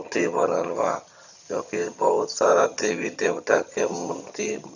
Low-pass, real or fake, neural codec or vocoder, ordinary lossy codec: 7.2 kHz; fake; vocoder, 22.05 kHz, 80 mel bands, HiFi-GAN; none